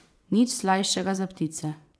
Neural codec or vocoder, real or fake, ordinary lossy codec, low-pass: vocoder, 22.05 kHz, 80 mel bands, WaveNeXt; fake; none; none